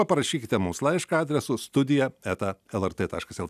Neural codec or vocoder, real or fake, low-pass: none; real; 14.4 kHz